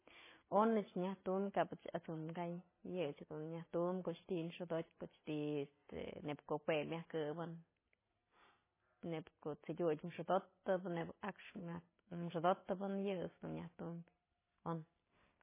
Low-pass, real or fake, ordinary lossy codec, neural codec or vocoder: 3.6 kHz; real; MP3, 16 kbps; none